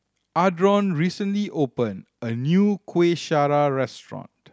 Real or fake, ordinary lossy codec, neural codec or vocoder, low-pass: real; none; none; none